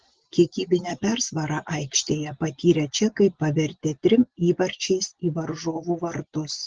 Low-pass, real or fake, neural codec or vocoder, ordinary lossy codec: 7.2 kHz; real; none; Opus, 16 kbps